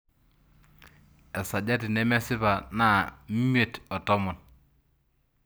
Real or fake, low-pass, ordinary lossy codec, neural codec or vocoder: real; none; none; none